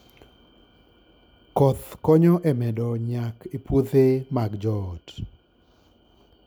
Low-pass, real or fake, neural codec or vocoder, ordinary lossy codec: none; real; none; none